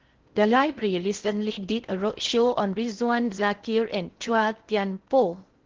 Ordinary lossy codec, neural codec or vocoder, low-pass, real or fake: Opus, 16 kbps; codec, 16 kHz in and 24 kHz out, 0.6 kbps, FocalCodec, streaming, 4096 codes; 7.2 kHz; fake